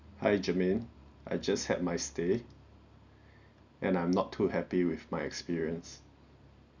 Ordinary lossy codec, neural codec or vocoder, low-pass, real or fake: none; none; 7.2 kHz; real